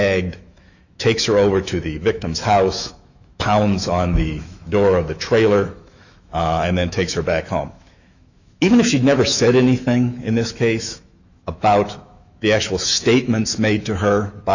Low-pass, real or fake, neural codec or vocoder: 7.2 kHz; fake; autoencoder, 48 kHz, 128 numbers a frame, DAC-VAE, trained on Japanese speech